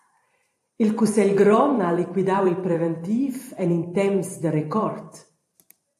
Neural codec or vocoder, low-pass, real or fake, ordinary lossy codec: vocoder, 44.1 kHz, 128 mel bands every 512 samples, BigVGAN v2; 14.4 kHz; fake; AAC, 64 kbps